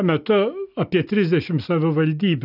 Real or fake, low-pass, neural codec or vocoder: real; 5.4 kHz; none